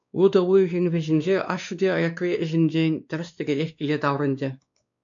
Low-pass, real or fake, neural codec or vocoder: 7.2 kHz; fake; codec, 16 kHz, 2 kbps, X-Codec, WavLM features, trained on Multilingual LibriSpeech